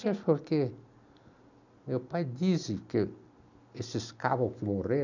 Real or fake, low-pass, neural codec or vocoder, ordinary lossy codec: real; 7.2 kHz; none; none